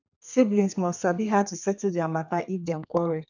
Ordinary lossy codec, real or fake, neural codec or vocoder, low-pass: none; fake; codec, 32 kHz, 1.9 kbps, SNAC; 7.2 kHz